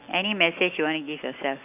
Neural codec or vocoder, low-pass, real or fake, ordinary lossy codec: none; 3.6 kHz; real; none